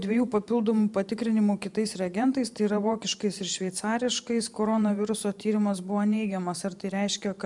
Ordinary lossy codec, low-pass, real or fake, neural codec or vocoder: MP3, 96 kbps; 10.8 kHz; fake; vocoder, 44.1 kHz, 128 mel bands every 512 samples, BigVGAN v2